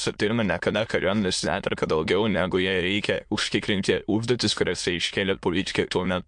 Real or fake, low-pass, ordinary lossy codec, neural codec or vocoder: fake; 9.9 kHz; MP3, 64 kbps; autoencoder, 22.05 kHz, a latent of 192 numbers a frame, VITS, trained on many speakers